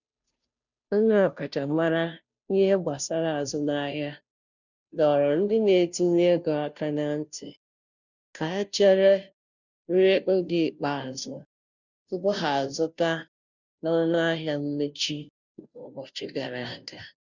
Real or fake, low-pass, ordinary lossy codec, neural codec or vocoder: fake; 7.2 kHz; none; codec, 16 kHz, 0.5 kbps, FunCodec, trained on Chinese and English, 25 frames a second